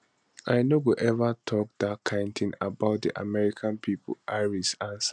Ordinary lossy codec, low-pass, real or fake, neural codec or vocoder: none; 9.9 kHz; real; none